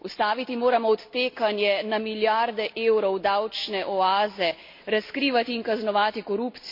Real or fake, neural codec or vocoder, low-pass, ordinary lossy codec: real; none; 5.4 kHz; MP3, 32 kbps